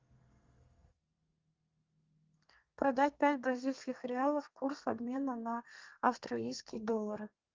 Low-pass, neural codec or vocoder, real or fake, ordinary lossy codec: 7.2 kHz; codec, 32 kHz, 1.9 kbps, SNAC; fake; Opus, 32 kbps